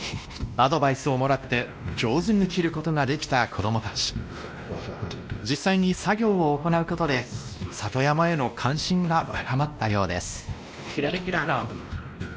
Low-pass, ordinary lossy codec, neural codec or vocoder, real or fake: none; none; codec, 16 kHz, 1 kbps, X-Codec, WavLM features, trained on Multilingual LibriSpeech; fake